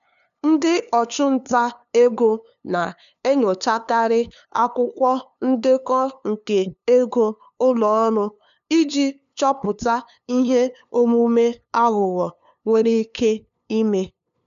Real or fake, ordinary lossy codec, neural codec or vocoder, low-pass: fake; none; codec, 16 kHz, 2 kbps, FunCodec, trained on LibriTTS, 25 frames a second; 7.2 kHz